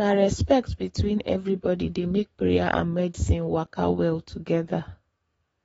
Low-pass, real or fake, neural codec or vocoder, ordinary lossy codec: 19.8 kHz; fake; codec, 44.1 kHz, 7.8 kbps, DAC; AAC, 24 kbps